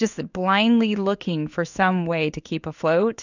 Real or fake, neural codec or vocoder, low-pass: fake; codec, 16 kHz in and 24 kHz out, 1 kbps, XY-Tokenizer; 7.2 kHz